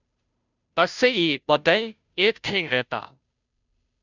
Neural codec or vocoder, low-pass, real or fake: codec, 16 kHz, 0.5 kbps, FunCodec, trained on Chinese and English, 25 frames a second; 7.2 kHz; fake